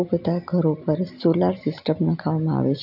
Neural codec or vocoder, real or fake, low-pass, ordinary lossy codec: none; real; 5.4 kHz; none